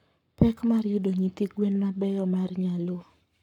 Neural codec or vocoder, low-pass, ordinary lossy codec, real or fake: codec, 44.1 kHz, 7.8 kbps, Pupu-Codec; 19.8 kHz; none; fake